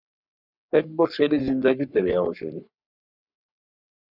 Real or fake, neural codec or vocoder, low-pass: fake; codec, 44.1 kHz, 3.4 kbps, Pupu-Codec; 5.4 kHz